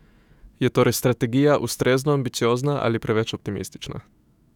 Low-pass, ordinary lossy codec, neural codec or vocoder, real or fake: 19.8 kHz; none; autoencoder, 48 kHz, 128 numbers a frame, DAC-VAE, trained on Japanese speech; fake